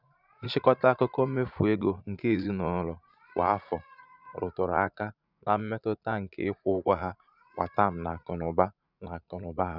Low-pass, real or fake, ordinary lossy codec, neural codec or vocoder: 5.4 kHz; fake; none; vocoder, 44.1 kHz, 80 mel bands, Vocos